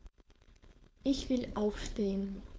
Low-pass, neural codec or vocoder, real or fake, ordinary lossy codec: none; codec, 16 kHz, 4.8 kbps, FACodec; fake; none